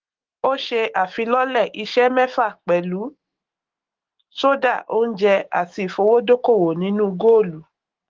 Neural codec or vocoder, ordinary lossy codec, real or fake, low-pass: autoencoder, 48 kHz, 128 numbers a frame, DAC-VAE, trained on Japanese speech; Opus, 16 kbps; fake; 7.2 kHz